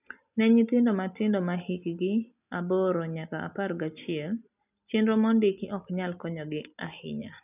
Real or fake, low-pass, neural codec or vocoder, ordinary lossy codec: real; 3.6 kHz; none; none